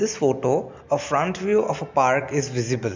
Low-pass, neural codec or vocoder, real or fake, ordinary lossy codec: 7.2 kHz; none; real; MP3, 64 kbps